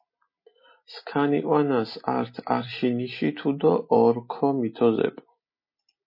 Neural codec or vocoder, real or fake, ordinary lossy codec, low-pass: none; real; MP3, 24 kbps; 5.4 kHz